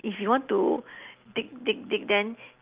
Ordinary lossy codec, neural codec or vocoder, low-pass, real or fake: Opus, 24 kbps; none; 3.6 kHz; real